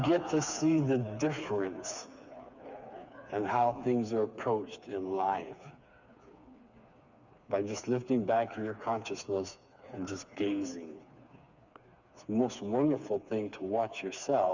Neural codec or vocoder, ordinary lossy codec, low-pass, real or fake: codec, 16 kHz, 4 kbps, FreqCodec, smaller model; Opus, 64 kbps; 7.2 kHz; fake